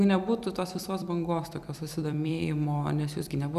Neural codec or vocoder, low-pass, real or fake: vocoder, 44.1 kHz, 128 mel bands every 256 samples, BigVGAN v2; 14.4 kHz; fake